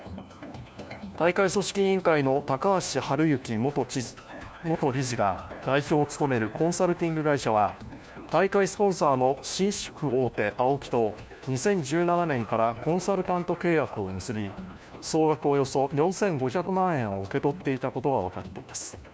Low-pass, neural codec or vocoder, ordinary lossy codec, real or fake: none; codec, 16 kHz, 1 kbps, FunCodec, trained on LibriTTS, 50 frames a second; none; fake